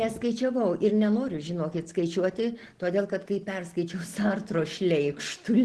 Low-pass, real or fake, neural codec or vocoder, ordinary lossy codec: 10.8 kHz; real; none; Opus, 16 kbps